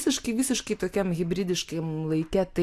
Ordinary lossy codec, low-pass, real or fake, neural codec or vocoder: AAC, 64 kbps; 14.4 kHz; real; none